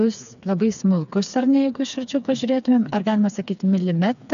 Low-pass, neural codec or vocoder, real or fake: 7.2 kHz; codec, 16 kHz, 4 kbps, FreqCodec, smaller model; fake